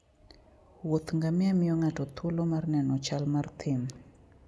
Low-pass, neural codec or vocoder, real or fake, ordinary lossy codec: none; none; real; none